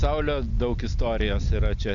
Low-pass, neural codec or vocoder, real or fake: 7.2 kHz; none; real